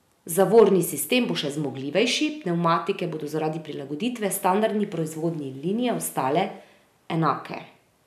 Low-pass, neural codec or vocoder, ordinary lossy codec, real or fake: 14.4 kHz; none; none; real